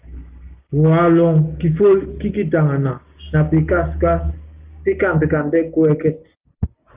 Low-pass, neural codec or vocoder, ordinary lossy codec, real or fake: 3.6 kHz; none; Opus, 24 kbps; real